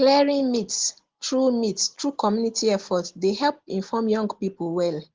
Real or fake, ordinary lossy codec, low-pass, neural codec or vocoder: real; Opus, 16 kbps; 7.2 kHz; none